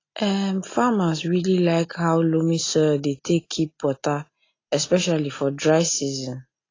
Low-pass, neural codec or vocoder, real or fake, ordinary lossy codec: 7.2 kHz; none; real; AAC, 32 kbps